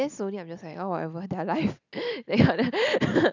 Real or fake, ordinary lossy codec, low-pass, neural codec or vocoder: real; none; 7.2 kHz; none